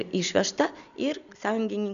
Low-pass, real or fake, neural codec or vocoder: 7.2 kHz; real; none